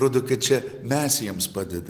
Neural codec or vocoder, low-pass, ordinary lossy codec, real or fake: none; 14.4 kHz; Opus, 24 kbps; real